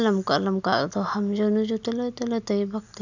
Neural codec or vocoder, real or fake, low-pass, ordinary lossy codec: none; real; 7.2 kHz; none